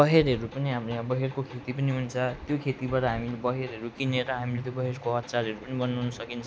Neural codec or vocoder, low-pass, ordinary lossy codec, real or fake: none; none; none; real